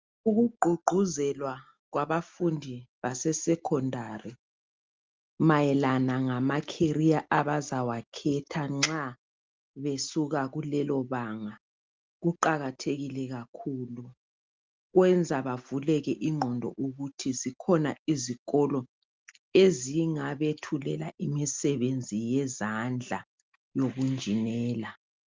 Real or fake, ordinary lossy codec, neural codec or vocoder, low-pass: real; Opus, 32 kbps; none; 7.2 kHz